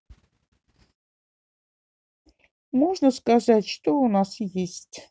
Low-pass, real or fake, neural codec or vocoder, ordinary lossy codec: none; real; none; none